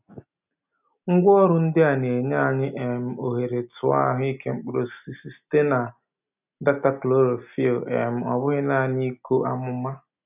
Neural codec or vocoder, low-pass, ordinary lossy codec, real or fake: none; 3.6 kHz; none; real